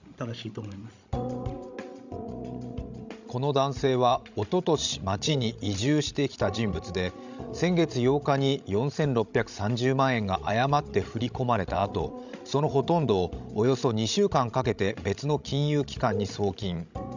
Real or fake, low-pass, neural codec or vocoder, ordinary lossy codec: fake; 7.2 kHz; codec, 16 kHz, 16 kbps, FreqCodec, larger model; none